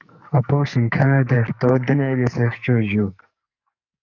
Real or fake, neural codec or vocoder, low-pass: fake; codec, 44.1 kHz, 2.6 kbps, SNAC; 7.2 kHz